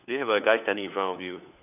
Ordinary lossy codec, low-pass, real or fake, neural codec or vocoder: none; 3.6 kHz; fake; codec, 16 kHz, 4 kbps, FunCodec, trained on LibriTTS, 50 frames a second